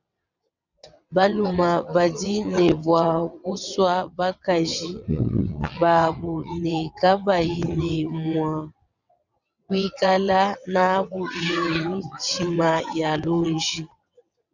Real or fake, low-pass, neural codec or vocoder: fake; 7.2 kHz; vocoder, 22.05 kHz, 80 mel bands, WaveNeXt